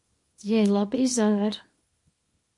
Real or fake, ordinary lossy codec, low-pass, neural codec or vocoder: fake; MP3, 48 kbps; 10.8 kHz; codec, 24 kHz, 0.9 kbps, WavTokenizer, small release